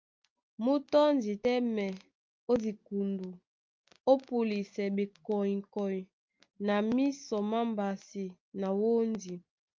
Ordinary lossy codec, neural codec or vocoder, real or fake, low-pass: Opus, 24 kbps; none; real; 7.2 kHz